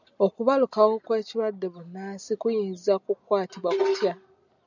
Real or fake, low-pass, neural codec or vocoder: real; 7.2 kHz; none